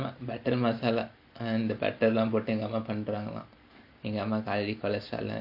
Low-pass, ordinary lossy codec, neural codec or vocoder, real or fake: 5.4 kHz; none; none; real